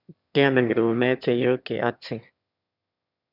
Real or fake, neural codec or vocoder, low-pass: fake; autoencoder, 22.05 kHz, a latent of 192 numbers a frame, VITS, trained on one speaker; 5.4 kHz